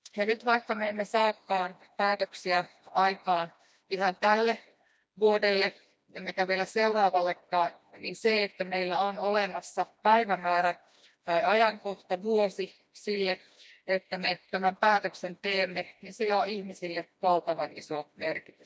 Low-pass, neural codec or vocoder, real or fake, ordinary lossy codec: none; codec, 16 kHz, 1 kbps, FreqCodec, smaller model; fake; none